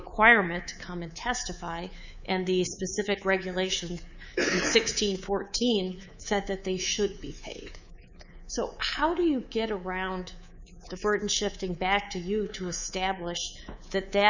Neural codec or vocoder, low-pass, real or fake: codec, 24 kHz, 3.1 kbps, DualCodec; 7.2 kHz; fake